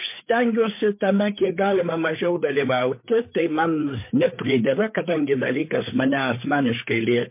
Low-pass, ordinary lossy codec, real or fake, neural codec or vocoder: 3.6 kHz; MP3, 24 kbps; fake; codec, 16 kHz, 4 kbps, FreqCodec, larger model